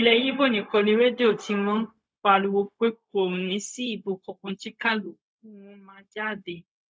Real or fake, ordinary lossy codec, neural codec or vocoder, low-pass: fake; none; codec, 16 kHz, 0.4 kbps, LongCat-Audio-Codec; none